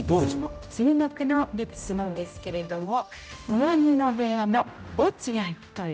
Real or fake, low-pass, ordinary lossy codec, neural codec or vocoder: fake; none; none; codec, 16 kHz, 0.5 kbps, X-Codec, HuBERT features, trained on general audio